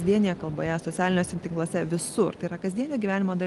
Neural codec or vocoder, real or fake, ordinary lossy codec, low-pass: none; real; Opus, 24 kbps; 10.8 kHz